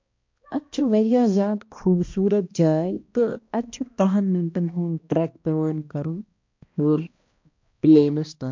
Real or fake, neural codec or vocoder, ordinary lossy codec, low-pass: fake; codec, 16 kHz, 1 kbps, X-Codec, HuBERT features, trained on balanced general audio; MP3, 48 kbps; 7.2 kHz